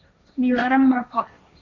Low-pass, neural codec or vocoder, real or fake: 7.2 kHz; codec, 16 kHz, 1.1 kbps, Voila-Tokenizer; fake